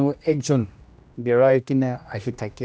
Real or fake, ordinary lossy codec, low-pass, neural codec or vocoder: fake; none; none; codec, 16 kHz, 1 kbps, X-Codec, HuBERT features, trained on general audio